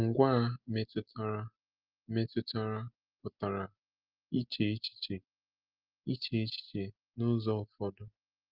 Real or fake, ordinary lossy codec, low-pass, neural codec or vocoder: real; Opus, 32 kbps; 5.4 kHz; none